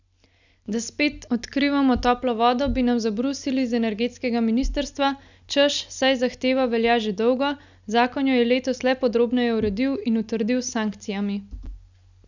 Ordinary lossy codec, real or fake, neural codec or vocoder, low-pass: none; real; none; 7.2 kHz